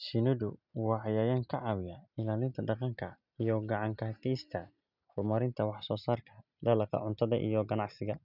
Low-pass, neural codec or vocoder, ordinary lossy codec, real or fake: 5.4 kHz; none; none; real